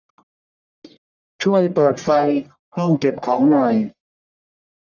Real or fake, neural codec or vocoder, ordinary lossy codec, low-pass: fake; codec, 44.1 kHz, 1.7 kbps, Pupu-Codec; none; 7.2 kHz